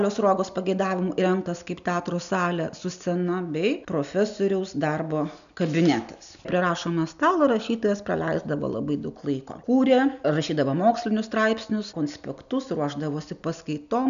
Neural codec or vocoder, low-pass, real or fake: none; 7.2 kHz; real